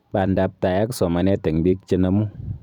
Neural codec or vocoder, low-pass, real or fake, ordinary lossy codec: vocoder, 44.1 kHz, 128 mel bands every 256 samples, BigVGAN v2; 19.8 kHz; fake; none